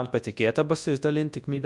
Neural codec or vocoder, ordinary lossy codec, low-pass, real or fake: codec, 24 kHz, 0.9 kbps, WavTokenizer, large speech release; MP3, 64 kbps; 10.8 kHz; fake